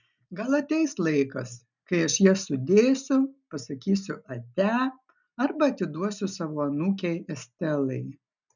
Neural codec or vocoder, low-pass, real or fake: none; 7.2 kHz; real